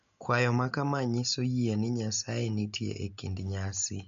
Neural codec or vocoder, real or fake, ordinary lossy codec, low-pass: none; real; MP3, 48 kbps; 7.2 kHz